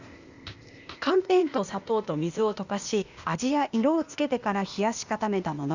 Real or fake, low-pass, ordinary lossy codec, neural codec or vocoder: fake; 7.2 kHz; Opus, 64 kbps; codec, 16 kHz, 0.8 kbps, ZipCodec